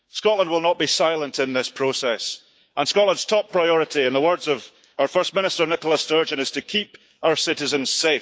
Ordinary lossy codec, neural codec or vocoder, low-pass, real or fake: none; codec, 16 kHz, 6 kbps, DAC; none; fake